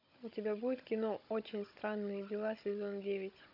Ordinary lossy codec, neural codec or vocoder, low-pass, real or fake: MP3, 48 kbps; codec, 16 kHz, 16 kbps, FunCodec, trained on Chinese and English, 50 frames a second; 5.4 kHz; fake